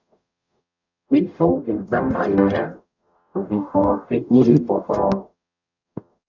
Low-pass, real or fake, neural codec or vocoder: 7.2 kHz; fake; codec, 44.1 kHz, 0.9 kbps, DAC